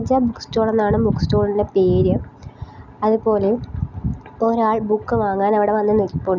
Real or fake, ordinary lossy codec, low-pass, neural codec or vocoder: real; none; 7.2 kHz; none